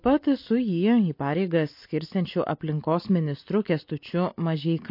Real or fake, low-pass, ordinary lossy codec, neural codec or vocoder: real; 5.4 kHz; MP3, 32 kbps; none